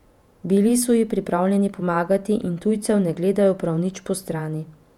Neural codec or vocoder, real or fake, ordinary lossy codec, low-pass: vocoder, 48 kHz, 128 mel bands, Vocos; fake; none; 19.8 kHz